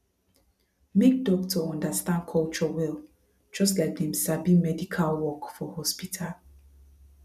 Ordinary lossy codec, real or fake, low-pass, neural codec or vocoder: none; real; 14.4 kHz; none